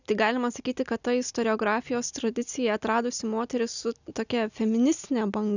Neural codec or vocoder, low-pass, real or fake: none; 7.2 kHz; real